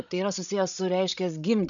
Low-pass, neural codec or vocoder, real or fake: 7.2 kHz; codec, 16 kHz, 16 kbps, FreqCodec, larger model; fake